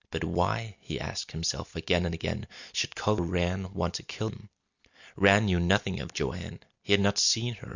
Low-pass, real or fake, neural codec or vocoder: 7.2 kHz; real; none